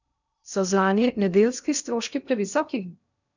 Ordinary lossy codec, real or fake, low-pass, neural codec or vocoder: none; fake; 7.2 kHz; codec, 16 kHz in and 24 kHz out, 0.6 kbps, FocalCodec, streaming, 2048 codes